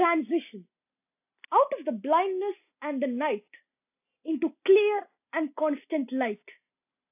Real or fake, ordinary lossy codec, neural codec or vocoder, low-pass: real; AAC, 32 kbps; none; 3.6 kHz